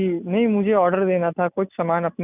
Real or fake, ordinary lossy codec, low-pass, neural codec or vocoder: real; none; 3.6 kHz; none